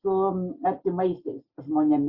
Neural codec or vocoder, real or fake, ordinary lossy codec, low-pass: none; real; Opus, 64 kbps; 5.4 kHz